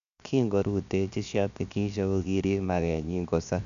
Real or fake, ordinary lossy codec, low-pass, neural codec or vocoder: fake; none; 7.2 kHz; codec, 16 kHz, 0.7 kbps, FocalCodec